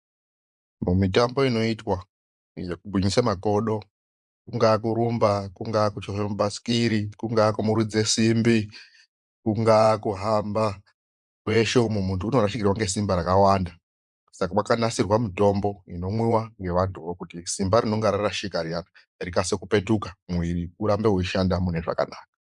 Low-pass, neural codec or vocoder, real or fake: 10.8 kHz; vocoder, 24 kHz, 100 mel bands, Vocos; fake